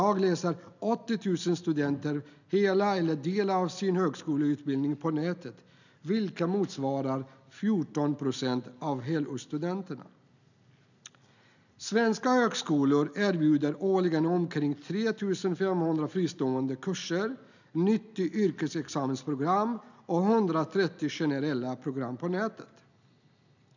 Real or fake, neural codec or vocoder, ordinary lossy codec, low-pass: real; none; none; 7.2 kHz